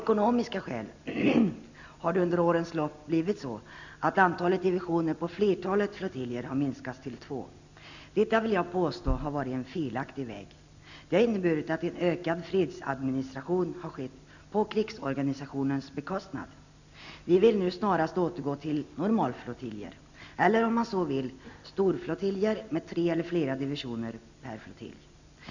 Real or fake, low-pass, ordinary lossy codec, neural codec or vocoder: real; 7.2 kHz; none; none